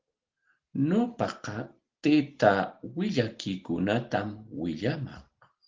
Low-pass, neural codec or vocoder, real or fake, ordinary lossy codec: 7.2 kHz; none; real; Opus, 16 kbps